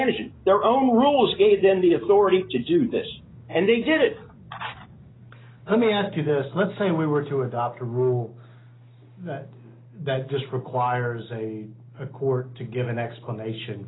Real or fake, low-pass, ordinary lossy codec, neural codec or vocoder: real; 7.2 kHz; AAC, 16 kbps; none